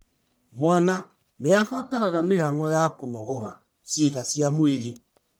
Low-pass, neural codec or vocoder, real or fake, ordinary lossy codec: none; codec, 44.1 kHz, 1.7 kbps, Pupu-Codec; fake; none